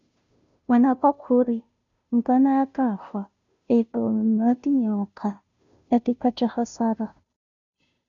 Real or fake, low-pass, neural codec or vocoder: fake; 7.2 kHz; codec, 16 kHz, 0.5 kbps, FunCodec, trained on Chinese and English, 25 frames a second